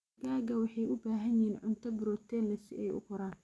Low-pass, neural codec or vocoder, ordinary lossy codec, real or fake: none; none; none; real